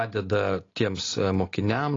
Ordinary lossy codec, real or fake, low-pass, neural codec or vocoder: AAC, 32 kbps; real; 7.2 kHz; none